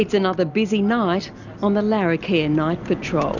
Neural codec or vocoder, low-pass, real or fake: none; 7.2 kHz; real